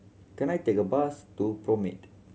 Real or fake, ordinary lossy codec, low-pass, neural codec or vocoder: real; none; none; none